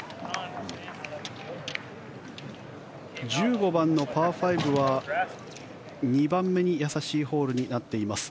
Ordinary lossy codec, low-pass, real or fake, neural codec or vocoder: none; none; real; none